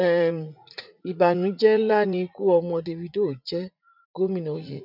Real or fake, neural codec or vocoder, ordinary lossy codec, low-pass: fake; vocoder, 44.1 kHz, 80 mel bands, Vocos; none; 5.4 kHz